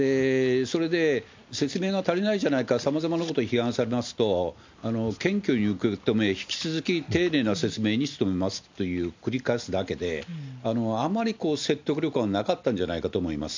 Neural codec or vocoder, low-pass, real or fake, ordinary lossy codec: none; 7.2 kHz; real; MP3, 64 kbps